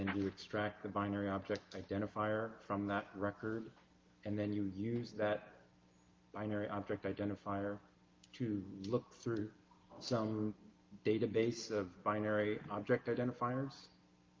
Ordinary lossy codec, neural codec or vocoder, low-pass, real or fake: Opus, 32 kbps; none; 7.2 kHz; real